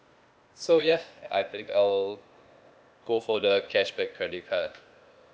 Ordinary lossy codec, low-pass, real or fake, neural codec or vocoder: none; none; fake; codec, 16 kHz, 0.8 kbps, ZipCodec